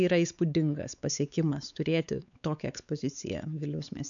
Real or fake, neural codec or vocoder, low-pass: fake; codec, 16 kHz, 4 kbps, X-Codec, WavLM features, trained on Multilingual LibriSpeech; 7.2 kHz